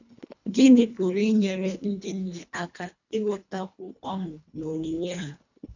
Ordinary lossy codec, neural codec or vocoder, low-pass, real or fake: none; codec, 24 kHz, 1.5 kbps, HILCodec; 7.2 kHz; fake